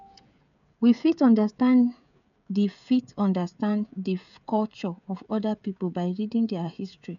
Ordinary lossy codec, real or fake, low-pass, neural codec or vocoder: none; fake; 7.2 kHz; codec, 16 kHz, 16 kbps, FreqCodec, smaller model